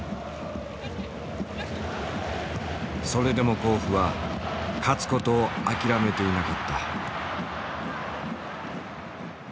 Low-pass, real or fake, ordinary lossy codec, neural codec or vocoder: none; real; none; none